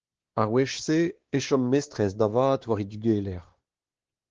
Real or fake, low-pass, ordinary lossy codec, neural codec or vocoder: fake; 7.2 kHz; Opus, 16 kbps; codec, 16 kHz, 2 kbps, X-Codec, WavLM features, trained on Multilingual LibriSpeech